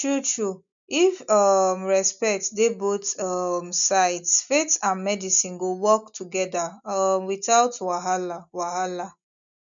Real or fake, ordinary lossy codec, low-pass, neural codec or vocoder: real; none; 7.2 kHz; none